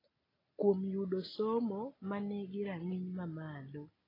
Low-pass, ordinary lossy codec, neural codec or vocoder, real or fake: 5.4 kHz; AAC, 24 kbps; none; real